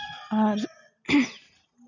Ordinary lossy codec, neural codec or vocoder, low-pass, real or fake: none; none; 7.2 kHz; real